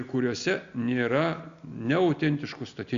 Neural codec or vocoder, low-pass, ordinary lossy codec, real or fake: none; 7.2 kHz; Opus, 64 kbps; real